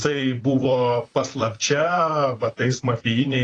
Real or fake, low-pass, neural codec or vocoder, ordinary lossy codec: fake; 10.8 kHz; vocoder, 44.1 kHz, 128 mel bands, Pupu-Vocoder; AAC, 32 kbps